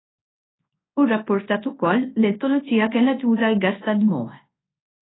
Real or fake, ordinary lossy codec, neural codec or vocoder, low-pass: fake; AAC, 16 kbps; codec, 24 kHz, 0.9 kbps, WavTokenizer, large speech release; 7.2 kHz